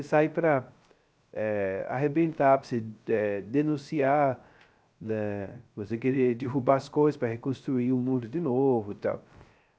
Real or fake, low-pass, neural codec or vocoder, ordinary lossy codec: fake; none; codec, 16 kHz, 0.3 kbps, FocalCodec; none